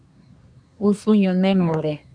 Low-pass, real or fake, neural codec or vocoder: 9.9 kHz; fake; codec, 24 kHz, 1 kbps, SNAC